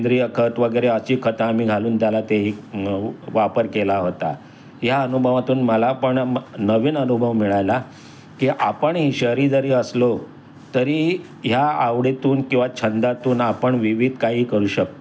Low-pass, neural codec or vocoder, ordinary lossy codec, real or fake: none; none; none; real